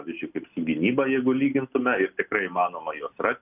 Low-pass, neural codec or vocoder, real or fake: 3.6 kHz; none; real